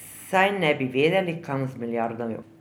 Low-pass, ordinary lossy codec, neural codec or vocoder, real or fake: none; none; none; real